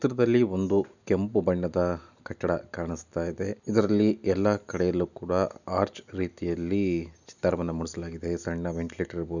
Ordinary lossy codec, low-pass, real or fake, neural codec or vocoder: none; 7.2 kHz; real; none